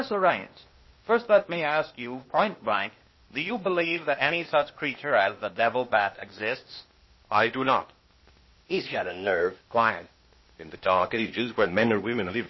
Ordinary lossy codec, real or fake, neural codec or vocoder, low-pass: MP3, 24 kbps; fake; codec, 16 kHz, 0.8 kbps, ZipCodec; 7.2 kHz